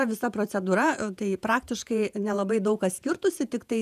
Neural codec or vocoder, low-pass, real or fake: vocoder, 48 kHz, 128 mel bands, Vocos; 14.4 kHz; fake